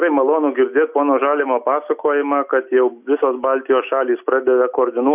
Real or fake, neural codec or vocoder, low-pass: real; none; 3.6 kHz